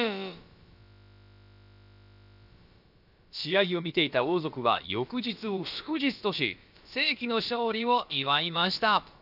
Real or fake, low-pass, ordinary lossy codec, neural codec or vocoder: fake; 5.4 kHz; none; codec, 16 kHz, about 1 kbps, DyCAST, with the encoder's durations